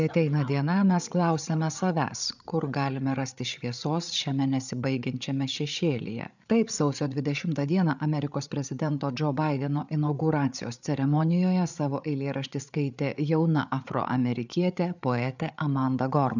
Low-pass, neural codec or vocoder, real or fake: 7.2 kHz; codec, 16 kHz, 8 kbps, FreqCodec, larger model; fake